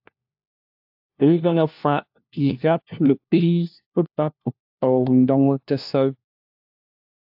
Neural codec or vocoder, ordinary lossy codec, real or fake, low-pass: codec, 16 kHz, 1 kbps, FunCodec, trained on LibriTTS, 50 frames a second; AAC, 48 kbps; fake; 5.4 kHz